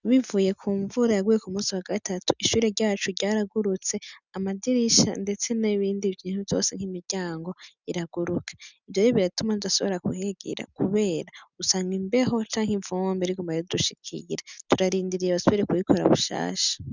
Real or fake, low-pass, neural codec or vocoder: real; 7.2 kHz; none